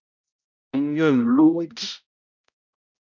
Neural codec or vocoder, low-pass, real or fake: codec, 16 kHz, 0.5 kbps, X-Codec, HuBERT features, trained on balanced general audio; 7.2 kHz; fake